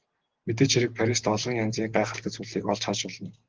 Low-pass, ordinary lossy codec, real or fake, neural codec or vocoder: 7.2 kHz; Opus, 16 kbps; real; none